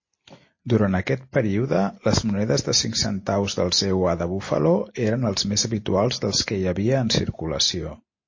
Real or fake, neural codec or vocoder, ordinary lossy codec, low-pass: real; none; MP3, 32 kbps; 7.2 kHz